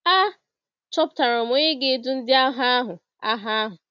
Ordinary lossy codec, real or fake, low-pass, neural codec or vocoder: none; real; 7.2 kHz; none